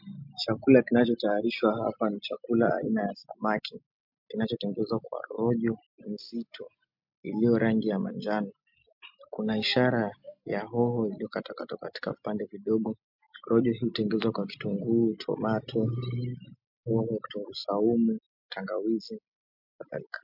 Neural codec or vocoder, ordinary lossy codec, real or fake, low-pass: none; MP3, 48 kbps; real; 5.4 kHz